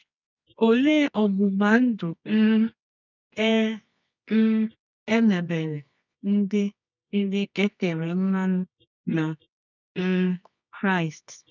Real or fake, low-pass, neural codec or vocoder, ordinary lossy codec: fake; 7.2 kHz; codec, 24 kHz, 0.9 kbps, WavTokenizer, medium music audio release; none